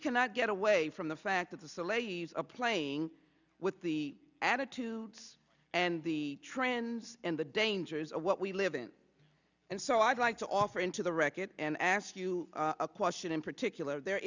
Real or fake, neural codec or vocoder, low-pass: real; none; 7.2 kHz